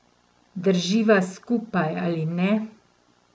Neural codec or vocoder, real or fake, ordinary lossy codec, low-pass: none; real; none; none